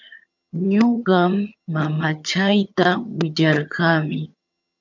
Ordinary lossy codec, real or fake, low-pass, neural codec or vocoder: MP3, 64 kbps; fake; 7.2 kHz; vocoder, 22.05 kHz, 80 mel bands, HiFi-GAN